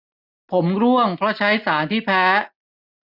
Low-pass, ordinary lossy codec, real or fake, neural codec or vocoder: 5.4 kHz; none; real; none